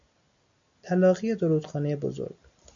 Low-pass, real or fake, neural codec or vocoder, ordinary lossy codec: 7.2 kHz; real; none; MP3, 64 kbps